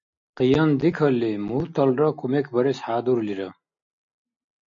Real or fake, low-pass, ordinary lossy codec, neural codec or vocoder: real; 7.2 kHz; MP3, 64 kbps; none